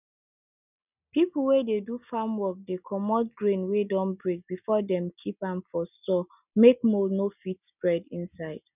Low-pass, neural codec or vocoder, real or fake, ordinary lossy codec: 3.6 kHz; none; real; none